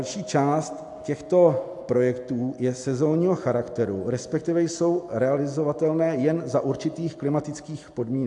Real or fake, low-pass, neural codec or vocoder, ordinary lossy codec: real; 10.8 kHz; none; AAC, 64 kbps